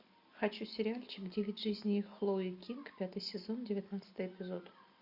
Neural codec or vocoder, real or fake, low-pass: none; real; 5.4 kHz